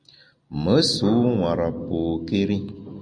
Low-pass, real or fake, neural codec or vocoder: 9.9 kHz; real; none